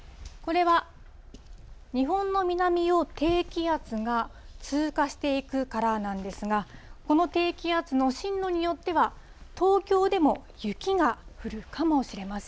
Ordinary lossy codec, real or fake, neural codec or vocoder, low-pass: none; real; none; none